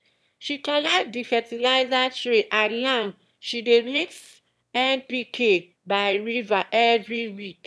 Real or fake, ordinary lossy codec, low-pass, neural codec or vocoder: fake; none; none; autoencoder, 22.05 kHz, a latent of 192 numbers a frame, VITS, trained on one speaker